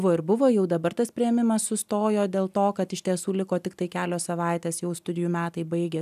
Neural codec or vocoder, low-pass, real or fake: none; 14.4 kHz; real